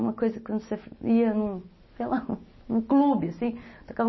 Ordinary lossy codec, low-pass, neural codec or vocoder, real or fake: MP3, 24 kbps; 7.2 kHz; none; real